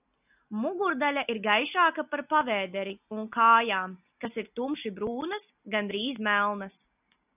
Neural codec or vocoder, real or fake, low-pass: none; real; 3.6 kHz